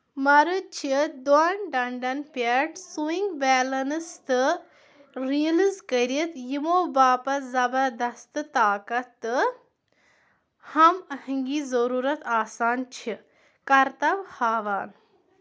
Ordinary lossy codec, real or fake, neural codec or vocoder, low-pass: none; real; none; none